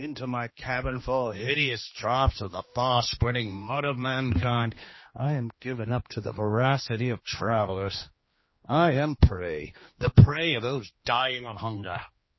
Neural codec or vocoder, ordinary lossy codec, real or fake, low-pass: codec, 16 kHz, 2 kbps, X-Codec, HuBERT features, trained on general audio; MP3, 24 kbps; fake; 7.2 kHz